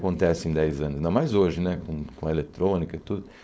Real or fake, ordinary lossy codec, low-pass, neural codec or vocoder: fake; none; none; codec, 16 kHz, 4.8 kbps, FACodec